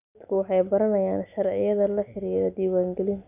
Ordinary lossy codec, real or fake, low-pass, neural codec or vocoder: none; real; 3.6 kHz; none